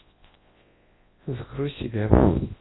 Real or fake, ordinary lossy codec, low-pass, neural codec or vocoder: fake; AAC, 16 kbps; 7.2 kHz; codec, 24 kHz, 0.9 kbps, WavTokenizer, large speech release